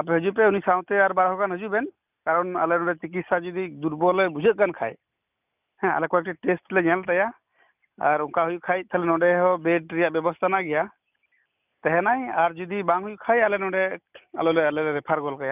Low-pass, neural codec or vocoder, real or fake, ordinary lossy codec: 3.6 kHz; none; real; none